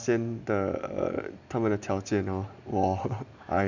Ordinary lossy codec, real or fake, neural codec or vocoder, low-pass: none; real; none; 7.2 kHz